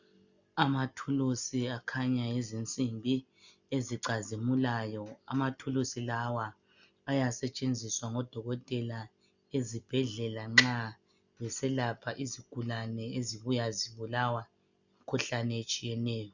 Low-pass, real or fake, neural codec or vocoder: 7.2 kHz; real; none